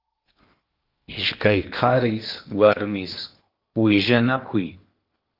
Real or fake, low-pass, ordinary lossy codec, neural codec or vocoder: fake; 5.4 kHz; Opus, 24 kbps; codec, 16 kHz in and 24 kHz out, 0.8 kbps, FocalCodec, streaming, 65536 codes